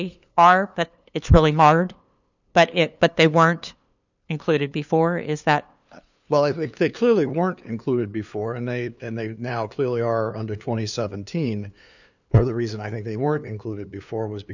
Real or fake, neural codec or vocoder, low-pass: fake; codec, 16 kHz, 2 kbps, FunCodec, trained on LibriTTS, 25 frames a second; 7.2 kHz